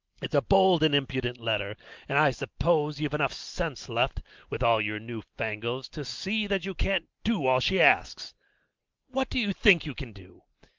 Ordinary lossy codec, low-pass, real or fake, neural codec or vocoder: Opus, 32 kbps; 7.2 kHz; real; none